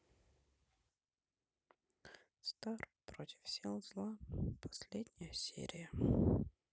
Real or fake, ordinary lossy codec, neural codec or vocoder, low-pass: real; none; none; none